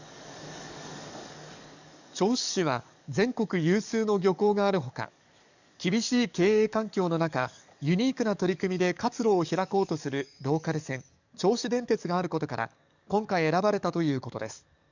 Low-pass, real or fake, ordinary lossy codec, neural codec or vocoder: 7.2 kHz; fake; none; codec, 44.1 kHz, 7.8 kbps, DAC